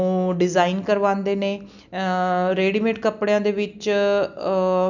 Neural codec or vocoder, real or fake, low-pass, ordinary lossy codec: none; real; 7.2 kHz; none